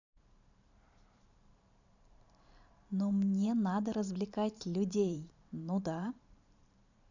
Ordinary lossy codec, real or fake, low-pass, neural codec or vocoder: none; fake; 7.2 kHz; vocoder, 44.1 kHz, 128 mel bands every 512 samples, BigVGAN v2